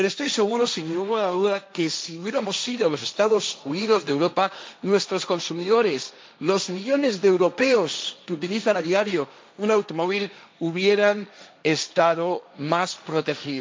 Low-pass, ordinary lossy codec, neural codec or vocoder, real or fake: none; none; codec, 16 kHz, 1.1 kbps, Voila-Tokenizer; fake